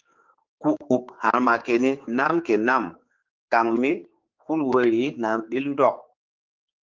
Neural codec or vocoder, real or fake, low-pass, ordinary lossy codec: codec, 16 kHz, 4 kbps, X-Codec, HuBERT features, trained on general audio; fake; 7.2 kHz; Opus, 32 kbps